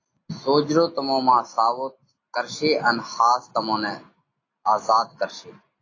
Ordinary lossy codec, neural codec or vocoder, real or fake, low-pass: AAC, 32 kbps; none; real; 7.2 kHz